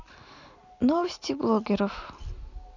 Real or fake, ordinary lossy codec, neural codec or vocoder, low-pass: real; none; none; 7.2 kHz